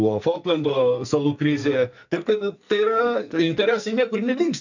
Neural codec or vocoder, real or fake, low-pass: codec, 44.1 kHz, 2.6 kbps, SNAC; fake; 7.2 kHz